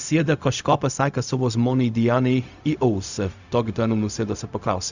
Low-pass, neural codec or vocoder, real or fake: 7.2 kHz; codec, 16 kHz, 0.4 kbps, LongCat-Audio-Codec; fake